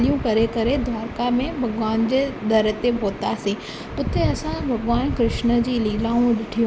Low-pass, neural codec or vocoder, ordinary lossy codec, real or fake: none; none; none; real